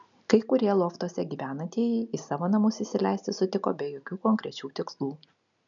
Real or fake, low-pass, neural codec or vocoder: real; 7.2 kHz; none